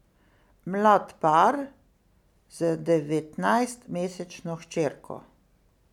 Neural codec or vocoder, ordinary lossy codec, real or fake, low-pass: none; none; real; 19.8 kHz